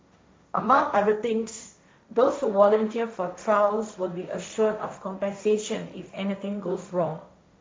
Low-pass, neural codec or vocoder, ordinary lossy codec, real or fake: none; codec, 16 kHz, 1.1 kbps, Voila-Tokenizer; none; fake